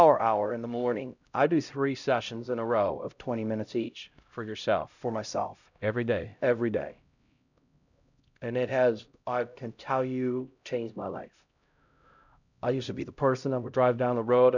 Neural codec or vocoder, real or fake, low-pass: codec, 16 kHz, 0.5 kbps, X-Codec, HuBERT features, trained on LibriSpeech; fake; 7.2 kHz